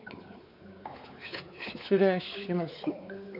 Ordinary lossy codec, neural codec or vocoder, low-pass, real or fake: none; codec, 16 kHz, 4 kbps, X-Codec, WavLM features, trained on Multilingual LibriSpeech; 5.4 kHz; fake